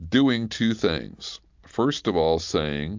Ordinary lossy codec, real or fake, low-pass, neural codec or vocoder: MP3, 64 kbps; real; 7.2 kHz; none